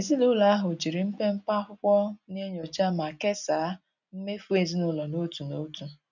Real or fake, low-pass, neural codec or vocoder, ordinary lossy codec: real; 7.2 kHz; none; none